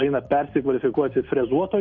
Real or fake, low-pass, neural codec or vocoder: real; 7.2 kHz; none